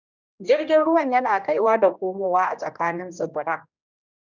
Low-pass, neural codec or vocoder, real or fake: 7.2 kHz; codec, 16 kHz, 1 kbps, X-Codec, HuBERT features, trained on general audio; fake